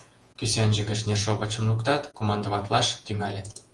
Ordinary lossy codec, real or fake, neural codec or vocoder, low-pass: Opus, 24 kbps; fake; vocoder, 48 kHz, 128 mel bands, Vocos; 10.8 kHz